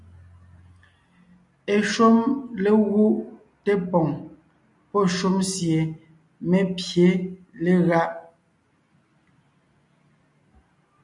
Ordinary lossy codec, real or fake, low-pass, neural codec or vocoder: MP3, 96 kbps; real; 10.8 kHz; none